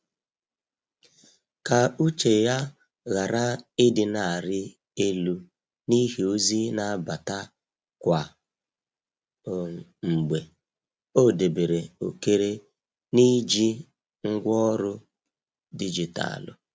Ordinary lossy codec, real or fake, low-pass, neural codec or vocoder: none; real; none; none